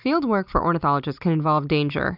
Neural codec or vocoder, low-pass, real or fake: none; 5.4 kHz; real